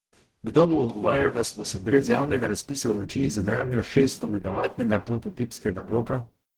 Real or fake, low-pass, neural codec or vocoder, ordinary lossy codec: fake; 19.8 kHz; codec, 44.1 kHz, 0.9 kbps, DAC; Opus, 16 kbps